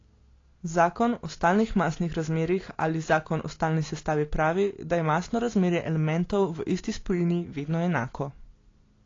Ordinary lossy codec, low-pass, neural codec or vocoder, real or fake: AAC, 32 kbps; 7.2 kHz; none; real